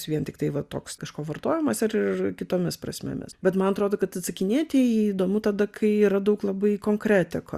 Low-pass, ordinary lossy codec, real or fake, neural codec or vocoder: 14.4 kHz; Opus, 64 kbps; real; none